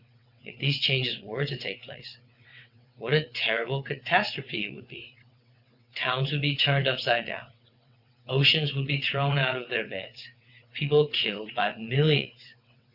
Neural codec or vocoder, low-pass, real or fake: vocoder, 22.05 kHz, 80 mel bands, WaveNeXt; 5.4 kHz; fake